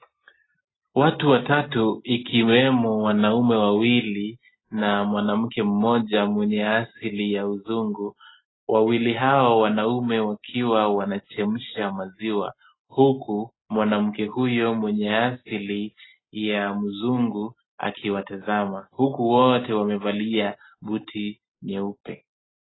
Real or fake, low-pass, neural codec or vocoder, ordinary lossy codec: real; 7.2 kHz; none; AAC, 16 kbps